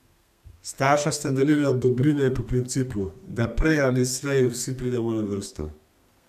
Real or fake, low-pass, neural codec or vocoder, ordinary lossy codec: fake; 14.4 kHz; codec, 32 kHz, 1.9 kbps, SNAC; none